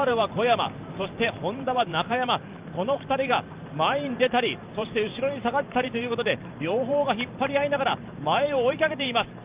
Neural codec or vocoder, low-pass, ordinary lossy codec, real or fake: vocoder, 44.1 kHz, 128 mel bands every 512 samples, BigVGAN v2; 3.6 kHz; Opus, 24 kbps; fake